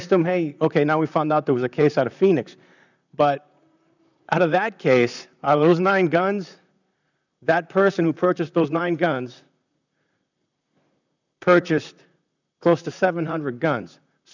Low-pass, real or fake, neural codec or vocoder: 7.2 kHz; fake; vocoder, 44.1 kHz, 128 mel bands, Pupu-Vocoder